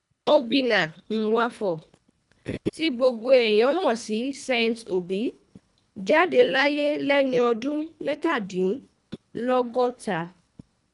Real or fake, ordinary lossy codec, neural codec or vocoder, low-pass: fake; none; codec, 24 kHz, 1.5 kbps, HILCodec; 10.8 kHz